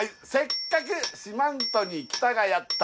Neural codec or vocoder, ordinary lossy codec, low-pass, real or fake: none; none; none; real